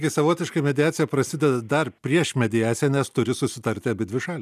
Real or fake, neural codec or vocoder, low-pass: real; none; 14.4 kHz